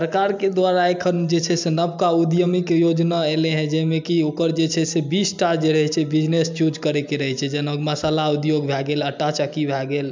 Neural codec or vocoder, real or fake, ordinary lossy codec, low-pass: none; real; MP3, 64 kbps; 7.2 kHz